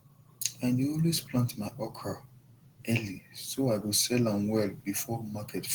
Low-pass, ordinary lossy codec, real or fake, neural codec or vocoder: 19.8 kHz; Opus, 16 kbps; real; none